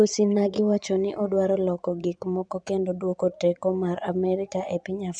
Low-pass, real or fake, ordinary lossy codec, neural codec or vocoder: 9.9 kHz; fake; none; vocoder, 24 kHz, 100 mel bands, Vocos